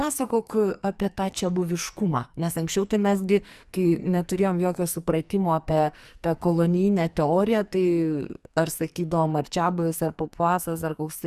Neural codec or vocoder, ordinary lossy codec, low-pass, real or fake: codec, 44.1 kHz, 2.6 kbps, SNAC; Opus, 64 kbps; 14.4 kHz; fake